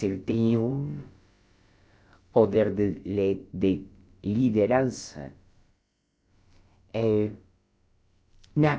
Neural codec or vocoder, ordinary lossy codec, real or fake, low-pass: codec, 16 kHz, about 1 kbps, DyCAST, with the encoder's durations; none; fake; none